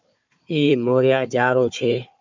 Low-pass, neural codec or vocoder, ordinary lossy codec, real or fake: 7.2 kHz; codec, 16 kHz, 4 kbps, FunCodec, trained on Chinese and English, 50 frames a second; MP3, 64 kbps; fake